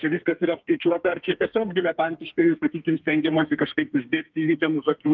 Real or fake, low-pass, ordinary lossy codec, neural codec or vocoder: fake; 7.2 kHz; Opus, 24 kbps; codec, 32 kHz, 1.9 kbps, SNAC